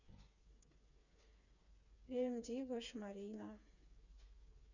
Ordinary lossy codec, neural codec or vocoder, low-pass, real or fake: none; codec, 16 kHz, 4 kbps, FreqCodec, smaller model; 7.2 kHz; fake